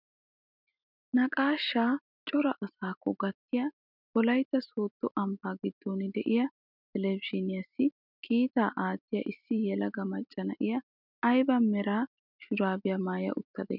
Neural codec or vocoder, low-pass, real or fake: none; 5.4 kHz; real